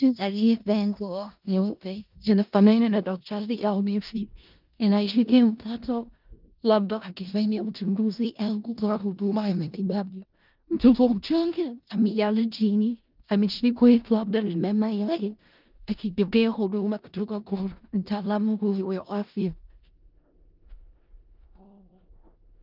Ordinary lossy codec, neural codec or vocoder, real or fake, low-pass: Opus, 24 kbps; codec, 16 kHz in and 24 kHz out, 0.4 kbps, LongCat-Audio-Codec, four codebook decoder; fake; 5.4 kHz